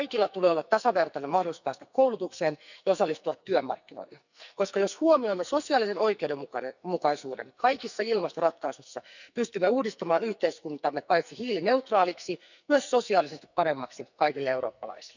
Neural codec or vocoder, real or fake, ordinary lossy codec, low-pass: codec, 44.1 kHz, 2.6 kbps, SNAC; fake; none; 7.2 kHz